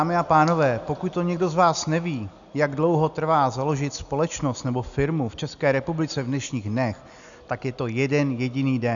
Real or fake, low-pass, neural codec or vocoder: real; 7.2 kHz; none